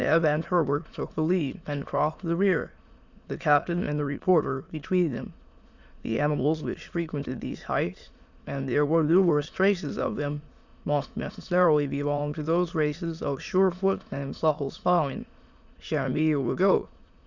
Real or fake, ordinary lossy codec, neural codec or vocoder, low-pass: fake; Opus, 64 kbps; autoencoder, 22.05 kHz, a latent of 192 numbers a frame, VITS, trained on many speakers; 7.2 kHz